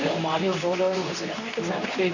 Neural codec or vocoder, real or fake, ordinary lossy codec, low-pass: codec, 24 kHz, 0.9 kbps, WavTokenizer, medium speech release version 2; fake; none; 7.2 kHz